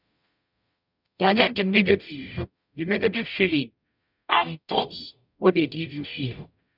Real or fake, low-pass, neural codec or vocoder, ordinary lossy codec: fake; 5.4 kHz; codec, 44.1 kHz, 0.9 kbps, DAC; none